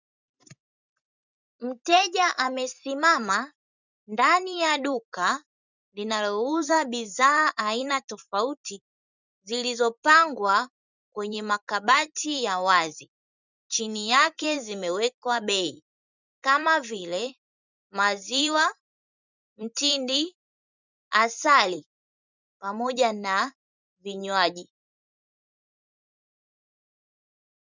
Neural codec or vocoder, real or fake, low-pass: vocoder, 44.1 kHz, 128 mel bands every 256 samples, BigVGAN v2; fake; 7.2 kHz